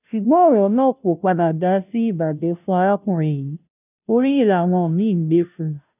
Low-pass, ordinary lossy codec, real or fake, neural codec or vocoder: 3.6 kHz; none; fake; codec, 16 kHz, 0.5 kbps, FunCodec, trained on Chinese and English, 25 frames a second